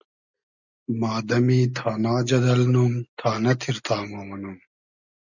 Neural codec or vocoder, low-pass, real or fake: none; 7.2 kHz; real